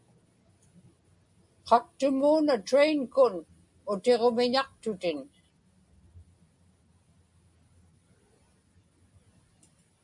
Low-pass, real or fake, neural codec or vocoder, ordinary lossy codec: 10.8 kHz; real; none; MP3, 96 kbps